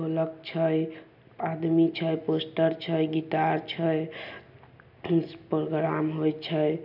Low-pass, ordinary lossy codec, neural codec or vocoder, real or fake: 5.4 kHz; none; none; real